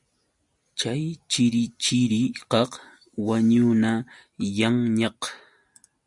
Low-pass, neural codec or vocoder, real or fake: 10.8 kHz; none; real